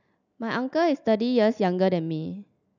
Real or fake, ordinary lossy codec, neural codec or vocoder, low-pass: real; none; none; 7.2 kHz